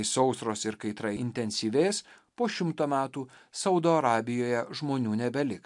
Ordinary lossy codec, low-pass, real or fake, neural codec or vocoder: MP3, 64 kbps; 10.8 kHz; real; none